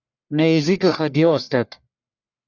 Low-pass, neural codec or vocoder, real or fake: 7.2 kHz; codec, 44.1 kHz, 1.7 kbps, Pupu-Codec; fake